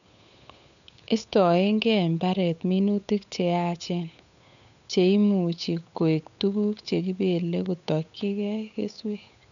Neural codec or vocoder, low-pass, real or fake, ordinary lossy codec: codec, 16 kHz, 8 kbps, FunCodec, trained on Chinese and English, 25 frames a second; 7.2 kHz; fake; none